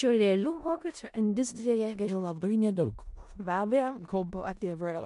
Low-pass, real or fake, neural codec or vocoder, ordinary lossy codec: 10.8 kHz; fake; codec, 16 kHz in and 24 kHz out, 0.4 kbps, LongCat-Audio-Codec, four codebook decoder; MP3, 64 kbps